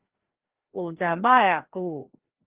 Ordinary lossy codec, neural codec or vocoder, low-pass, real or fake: Opus, 16 kbps; codec, 16 kHz, 1 kbps, FreqCodec, larger model; 3.6 kHz; fake